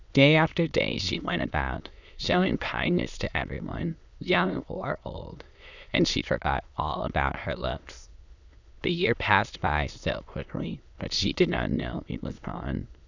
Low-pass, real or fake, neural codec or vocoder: 7.2 kHz; fake; autoencoder, 22.05 kHz, a latent of 192 numbers a frame, VITS, trained on many speakers